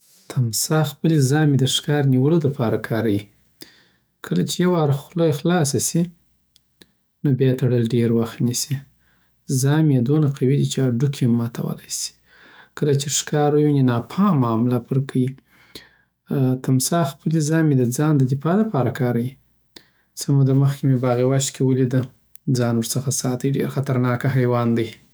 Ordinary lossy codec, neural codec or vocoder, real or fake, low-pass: none; autoencoder, 48 kHz, 128 numbers a frame, DAC-VAE, trained on Japanese speech; fake; none